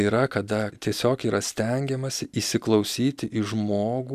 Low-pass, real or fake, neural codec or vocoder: 14.4 kHz; real; none